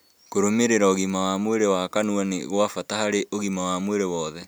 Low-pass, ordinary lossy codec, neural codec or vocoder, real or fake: none; none; none; real